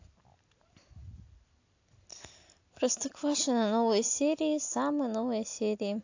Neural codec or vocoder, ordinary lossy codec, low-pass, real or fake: none; AAC, 48 kbps; 7.2 kHz; real